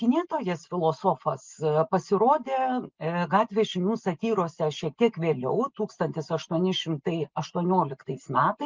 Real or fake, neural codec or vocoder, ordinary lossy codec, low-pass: real; none; Opus, 32 kbps; 7.2 kHz